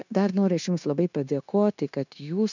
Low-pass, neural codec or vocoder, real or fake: 7.2 kHz; codec, 16 kHz, 0.9 kbps, LongCat-Audio-Codec; fake